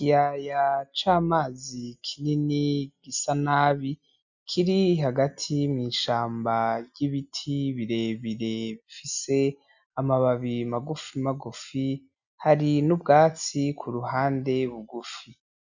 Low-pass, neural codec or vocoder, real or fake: 7.2 kHz; none; real